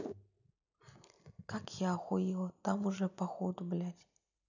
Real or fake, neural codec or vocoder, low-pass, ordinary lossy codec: real; none; 7.2 kHz; none